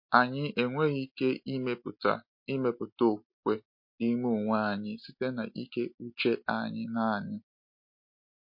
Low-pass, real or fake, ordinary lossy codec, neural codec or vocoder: 5.4 kHz; real; MP3, 32 kbps; none